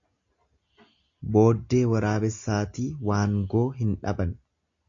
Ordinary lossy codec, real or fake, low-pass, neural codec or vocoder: AAC, 48 kbps; real; 7.2 kHz; none